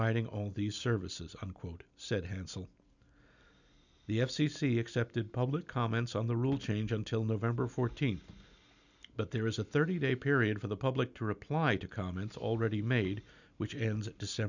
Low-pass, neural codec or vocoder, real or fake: 7.2 kHz; none; real